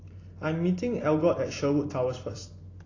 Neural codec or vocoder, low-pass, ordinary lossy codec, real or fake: none; 7.2 kHz; AAC, 32 kbps; real